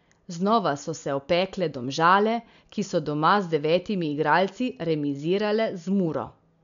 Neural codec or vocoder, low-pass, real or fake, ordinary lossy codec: none; 7.2 kHz; real; MP3, 96 kbps